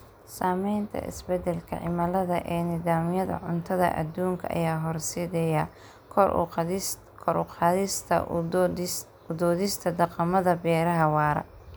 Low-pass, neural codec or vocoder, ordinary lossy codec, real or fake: none; none; none; real